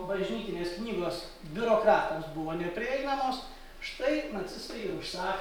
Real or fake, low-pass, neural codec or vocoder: real; 19.8 kHz; none